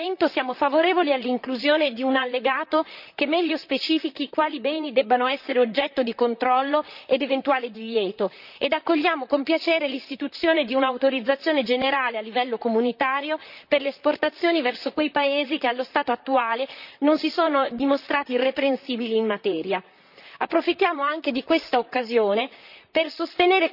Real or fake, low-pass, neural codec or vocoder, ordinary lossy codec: fake; 5.4 kHz; vocoder, 44.1 kHz, 128 mel bands, Pupu-Vocoder; none